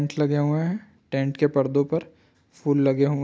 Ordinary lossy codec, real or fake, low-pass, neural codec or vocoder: none; real; none; none